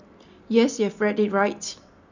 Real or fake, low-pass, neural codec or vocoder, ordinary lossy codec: real; 7.2 kHz; none; none